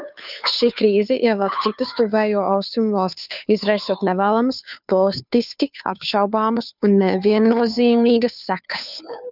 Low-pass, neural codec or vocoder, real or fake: 5.4 kHz; codec, 16 kHz, 2 kbps, FunCodec, trained on Chinese and English, 25 frames a second; fake